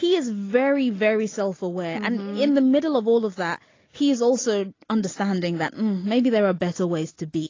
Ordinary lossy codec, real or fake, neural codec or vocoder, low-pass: AAC, 32 kbps; real; none; 7.2 kHz